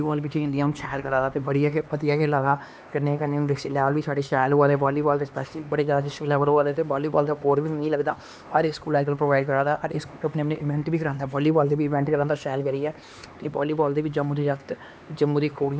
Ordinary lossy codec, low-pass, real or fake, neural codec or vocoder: none; none; fake; codec, 16 kHz, 2 kbps, X-Codec, HuBERT features, trained on LibriSpeech